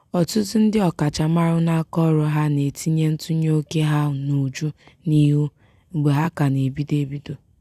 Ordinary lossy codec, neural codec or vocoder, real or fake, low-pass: none; none; real; 14.4 kHz